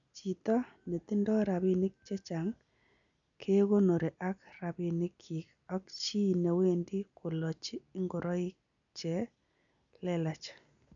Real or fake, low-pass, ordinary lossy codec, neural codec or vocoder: real; 7.2 kHz; none; none